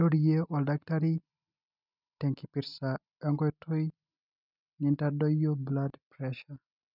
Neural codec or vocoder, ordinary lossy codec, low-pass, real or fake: none; none; 5.4 kHz; real